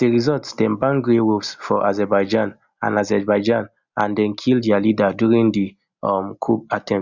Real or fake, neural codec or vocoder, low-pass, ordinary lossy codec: real; none; 7.2 kHz; Opus, 64 kbps